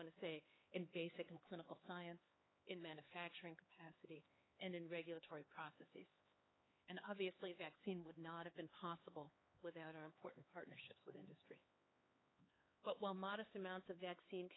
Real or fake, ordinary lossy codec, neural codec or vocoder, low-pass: fake; AAC, 16 kbps; codec, 16 kHz, 4 kbps, X-Codec, HuBERT features, trained on LibriSpeech; 7.2 kHz